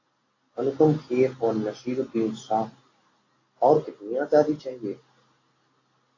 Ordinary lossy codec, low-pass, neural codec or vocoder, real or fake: AAC, 32 kbps; 7.2 kHz; none; real